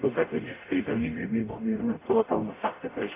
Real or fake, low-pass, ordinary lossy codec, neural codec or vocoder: fake; 3.6 kHz; AAC, 24 kbps; codec, 44.1 kHz, 0.9 kbps, DAC